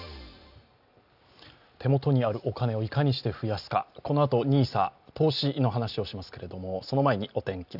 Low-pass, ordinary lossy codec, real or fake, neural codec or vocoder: 5.4 kHz; none; real; none